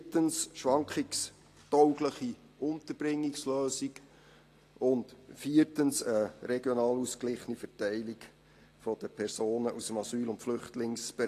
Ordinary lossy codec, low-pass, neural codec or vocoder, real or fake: AAC, 48 kbps; 14.4 kHz; none; real